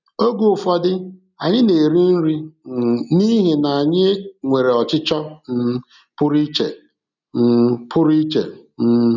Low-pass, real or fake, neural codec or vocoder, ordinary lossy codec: 7.2 kHz; real; none; none